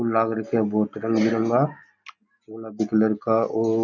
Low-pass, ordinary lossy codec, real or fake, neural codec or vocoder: 7.2 kHz; none; real; none